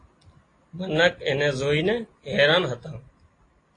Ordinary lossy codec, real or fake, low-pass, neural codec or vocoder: AAC, 32 kbps; real; 9.9 kHz; none